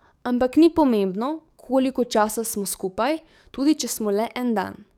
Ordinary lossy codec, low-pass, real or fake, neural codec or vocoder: none; 19.8 kHz; fake; codec, 44.1 kHz, 7.8 kbps, DAC